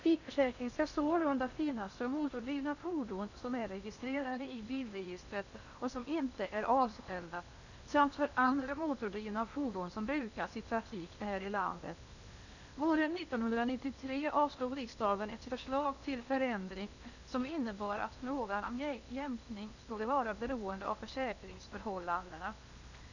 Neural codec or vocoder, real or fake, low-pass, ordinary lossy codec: codec, 16 kHz in and 24 kHz out, 0.8 kbps, FocalCodec, streaming, 65536 codes; fake; 7.2 kHz; none